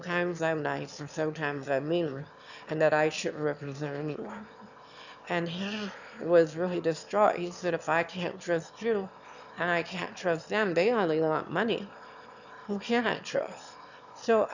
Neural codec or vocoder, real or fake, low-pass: autoencoder, 22.05 kHz, a latent of 192 numbers a frame, VITS, trained on one speaker; fake; 7.2 kHz